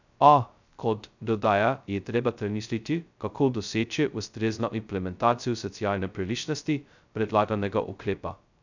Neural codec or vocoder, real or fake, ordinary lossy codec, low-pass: codec, 16 kHz, 0.2 kbps, FocalCodec; fake; none; 7.2 kHz